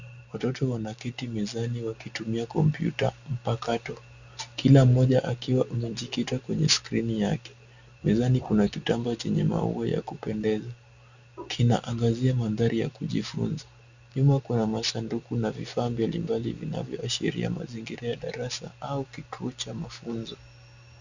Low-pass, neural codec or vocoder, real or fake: 7.2 kHz; none; real